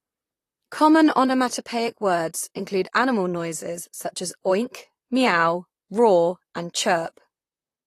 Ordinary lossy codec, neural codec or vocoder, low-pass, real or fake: AAC, 48 kbps; vocoder, 44.1 kHz, 128 mel bands, Pupu-Vocoder; 14.4 kHz; fake